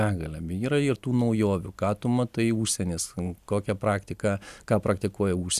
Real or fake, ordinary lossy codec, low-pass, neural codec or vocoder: real; Opus, 64 kbps; 14.4 kHz; none